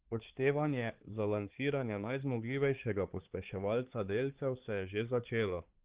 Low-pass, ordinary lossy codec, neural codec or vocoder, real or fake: 3.6 kHz; Opus, 16 kbps; codec, 16 kHz, 4 kbps, X-Codec, HuBERT features, trained on balanced general audio; fake